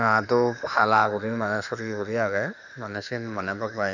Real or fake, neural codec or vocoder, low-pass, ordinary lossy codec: fake; autoencoder, 48 kHz, 32 numbers a frame, DAC-VAE, trained on Japanese speech; 7.2 kHz; none